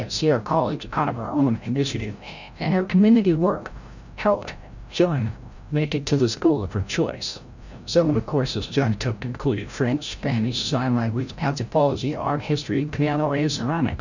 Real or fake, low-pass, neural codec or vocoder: fake; 7.2 kHz; codec, 16 kHz, 0.5 kbps, FreqCodec, larger model